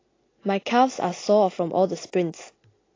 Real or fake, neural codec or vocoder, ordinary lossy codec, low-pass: real; none; AAC, 32 kbps; 7.2 kHz